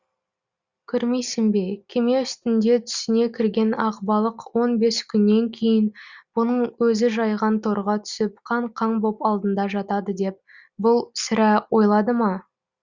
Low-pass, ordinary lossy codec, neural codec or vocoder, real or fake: 7.2 kHz; Opus, 64 kbps; none; real